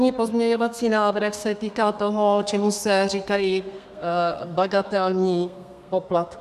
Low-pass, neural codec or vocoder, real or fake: 14.4 kHz; codec, 32 kHz, 1.9 kbps, SNAC; fake